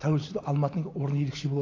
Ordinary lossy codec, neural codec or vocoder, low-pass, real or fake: AAC, 32 kbps; none; 7.2 kHz; real